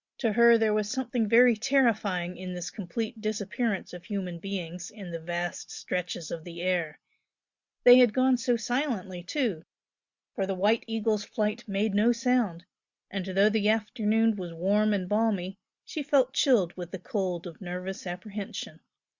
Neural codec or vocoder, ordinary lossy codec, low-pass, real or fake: none; Opus, 64 kbps; 7.2 kHz; real